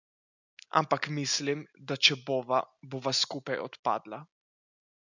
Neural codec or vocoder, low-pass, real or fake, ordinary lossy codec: none; 7.2 kHz; real; none